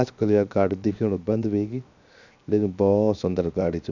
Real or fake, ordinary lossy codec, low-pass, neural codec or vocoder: fake; none; 7.2 kHz; codec, 16 kHz, 0.7 kbps, FocalCodec